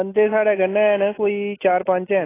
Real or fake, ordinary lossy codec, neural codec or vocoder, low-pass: real; AAC, 16 kbps; none; 3.6 kHz